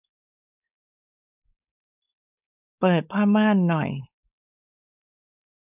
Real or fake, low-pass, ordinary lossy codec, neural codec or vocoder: fake; 3.6 kHz; none; codec, 16 kHz, 4.8 kbps, FACodec